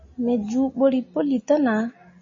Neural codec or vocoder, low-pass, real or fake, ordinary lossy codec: none; 7.2 kHz; real; MP3, 32 kbps